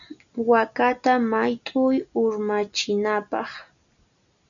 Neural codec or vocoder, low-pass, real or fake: none; 7.2 kHz; real